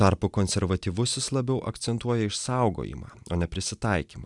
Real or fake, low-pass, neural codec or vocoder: real; 10.8 kHz; none